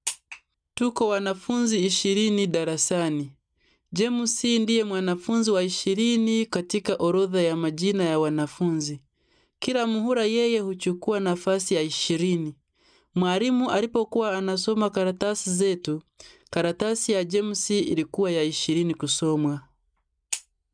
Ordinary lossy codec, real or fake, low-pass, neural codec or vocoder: none; real; 9.9 kHz; none